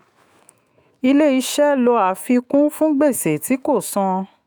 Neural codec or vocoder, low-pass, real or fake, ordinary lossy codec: autoencoder, 48 kHz, 128 numbers a frame, DAC-VAE, trained on Japanese speech; none; fake; none